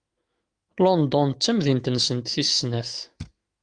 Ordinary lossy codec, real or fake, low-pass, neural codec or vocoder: Opus, 32 kbps; fake; 9.9 kHz; autoencoder, 48 kHz, 128 numbers a frame, DAC-VAE, trained on Japanese speech